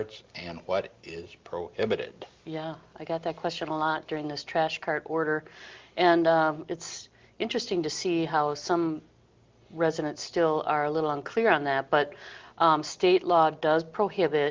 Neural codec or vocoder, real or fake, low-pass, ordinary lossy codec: none; real; 7.2 kHz; Opus, 32 kbps